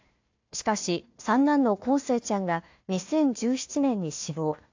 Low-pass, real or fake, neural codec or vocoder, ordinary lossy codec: 7.2 kHz; fake; codec, 16 kHz, 1 kbps, FunCodec, trained on Chinese and English, 50 frames a second; AAC, 48 kbps